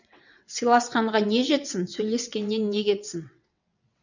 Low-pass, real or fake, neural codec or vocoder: 7.2 kHz; fake; vocoder, 22.05 kHz, 80 mel bands, WaveNeXt